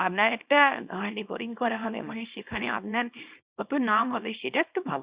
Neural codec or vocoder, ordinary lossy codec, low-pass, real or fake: codec, 24 kHz, 0.9 kbps, WavTokenizer, small release; Opus, 64 kbps; 3.6 kHz; fake